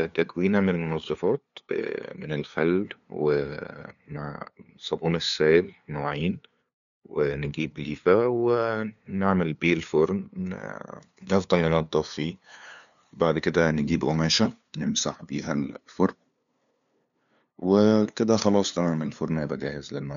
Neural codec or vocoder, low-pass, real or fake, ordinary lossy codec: codec, 16 kHz, 2 kbps, FunCodec, trained on LibriTTS, 25 frames a second; 7.2 kHz; fake; none